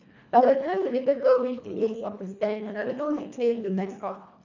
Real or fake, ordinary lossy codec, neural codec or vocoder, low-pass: fake; none; codec, 24 kHz, 1.5 kbps, HILCodec; 7.2 kHz